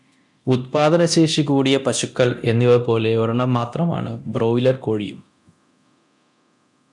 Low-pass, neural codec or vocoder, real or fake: 10.8 kHz; codec, 24 kHz, 0.9 kbps, DualCodec; fake